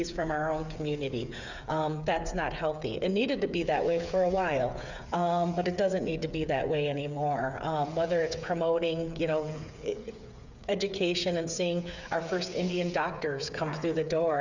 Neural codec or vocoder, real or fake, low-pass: codec, 16 kHz, 8 kbps, FreqCodec, smaller model; fake; 7.2 kHz